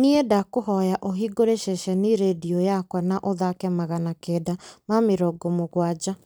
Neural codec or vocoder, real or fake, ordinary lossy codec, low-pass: vocoder, 44.1 kHz, 128 mel bands every 256 samples, BigVGAN v2; fake; none; none